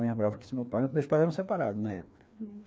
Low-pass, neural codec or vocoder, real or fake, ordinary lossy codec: none; codec, 16 kHz, 2 kbps, FreqCodec, larger model; fake; none